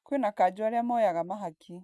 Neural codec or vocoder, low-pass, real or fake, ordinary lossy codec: none; none; real; none